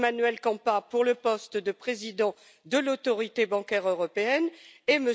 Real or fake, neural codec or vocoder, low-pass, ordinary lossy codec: real; none; none; none